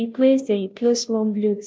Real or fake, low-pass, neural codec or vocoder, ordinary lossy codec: fake; none; codec, 16 kHz, 0.5 kbps, FunCodec, trained on Chinese and English, 25 frames a second; none